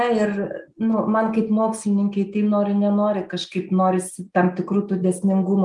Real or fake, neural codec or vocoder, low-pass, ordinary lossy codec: real; none; 10.8 kHz; Opus, 32 kbps